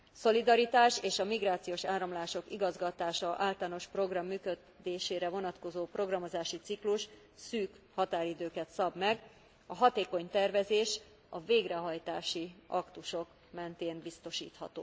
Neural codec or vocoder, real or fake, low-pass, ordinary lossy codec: none; real; none; none